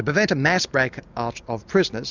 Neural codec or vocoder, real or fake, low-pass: none; real; 7.2 kHz